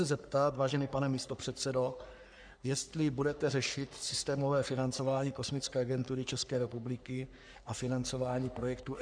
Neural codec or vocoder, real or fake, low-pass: codec, 44.1 kHz, 3.4 kbps, Pupu-Codec; fake; 9.9 kHz